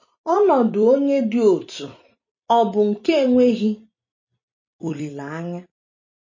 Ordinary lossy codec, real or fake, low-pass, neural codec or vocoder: MP3, 32 kbps; fake; 7.2 kHz; vocoder, 44.1 kHz, 128 mel bands every 256 samples, BigVGAN v2